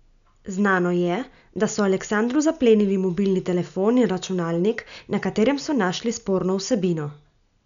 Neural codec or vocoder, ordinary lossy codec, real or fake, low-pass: none; none; real; 7.2 kHz